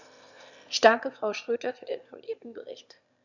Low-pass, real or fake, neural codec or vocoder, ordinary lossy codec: 7.2 kHz; fake; autoencoder, 22.05 kHz, a latent of 192 numbers a frame, VITS, trained on one speaker; none